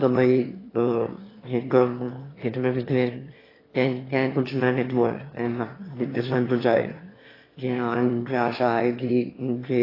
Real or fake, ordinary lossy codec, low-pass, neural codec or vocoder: fake; AAC, 24 kbps; 5.4 kHz; autoencoder, 22.05 kHz, a latent of 192 numbers a frame, VITS, trained on one speaker